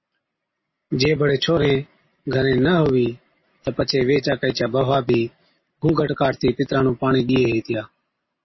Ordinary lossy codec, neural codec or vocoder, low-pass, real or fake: MP3, 24 kbps; none; 7.2 kHz; real